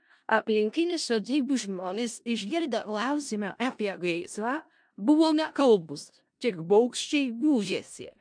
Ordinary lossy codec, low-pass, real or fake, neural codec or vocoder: MP3, 64 kbps; 9.9 kHz; fake; codec, 16 kHz in and 24 kHz out, 0.4 kbps, LongCat-Audio-Codec, four codebook decoder